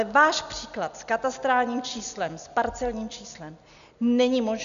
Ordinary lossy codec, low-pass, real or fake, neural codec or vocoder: MP3, 96 kbps; 7.2 kHz; real; none